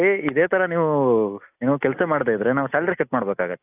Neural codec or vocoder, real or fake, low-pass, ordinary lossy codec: none; real; 3.6 kHz; AAC, 32 kbps